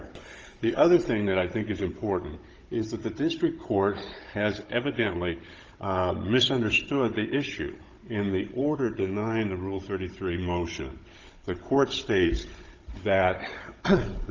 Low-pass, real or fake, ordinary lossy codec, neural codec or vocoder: 7.2 kHz; fake; Opus, 24 kbps; codec, 16 kHz, 16 kbps, FunCodec, trained on Chinese and English, 50 frames a second